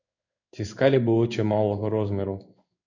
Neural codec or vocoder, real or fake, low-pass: codec, 16 kHz in and 24 kHz out, 1 kbps, XY-Tokenizer; fake; 7.2 kHz